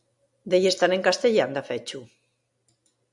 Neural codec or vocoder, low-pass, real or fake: none; 10.8 kHz; real